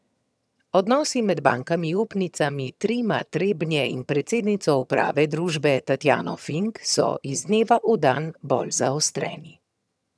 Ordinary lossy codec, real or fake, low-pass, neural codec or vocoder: none; fake; none; vocoder, 22.05 kHz, 80 mel bands, HiFi-GAN